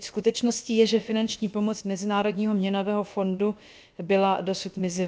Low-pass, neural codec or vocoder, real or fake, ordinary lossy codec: none; codec, 16 kHz, about 1 kbps, DyCAST, with the encoder's durations; fake; none